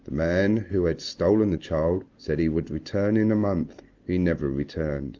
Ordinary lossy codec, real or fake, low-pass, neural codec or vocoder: Opus, 32 kbps; real; 7.2 kHz; none